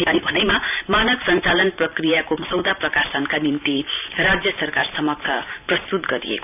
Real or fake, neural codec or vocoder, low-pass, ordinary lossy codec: fake; vocoder, 44.1 kHz, 128 mel bands every 512 samples, BigVGAN v2; 3.6 kHz; none